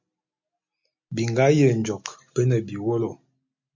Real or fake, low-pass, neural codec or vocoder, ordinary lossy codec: real; 7.2 kHz; none; MP3, 64 kbps